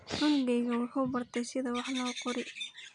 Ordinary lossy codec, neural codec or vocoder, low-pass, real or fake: none; none; 9.9 kHz; real